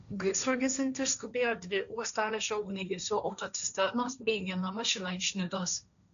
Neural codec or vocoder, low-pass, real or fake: codec, 16 kHz, 1.1 kbps, Voila-Tokenizer; 7.2 kHz; fake